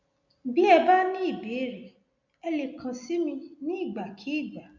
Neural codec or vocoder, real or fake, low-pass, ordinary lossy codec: none; real; 7.2 kHz; none